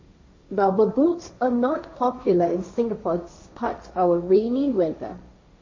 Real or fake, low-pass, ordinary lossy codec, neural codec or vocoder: fake; 7.2 kHz; MP3, 32 kbps; codec, 16 kHz, 1.1 kbps, Voila-Tokenizer